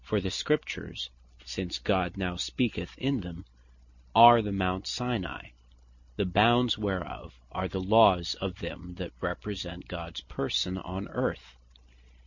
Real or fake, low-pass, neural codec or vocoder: real; 7.2 kHz; none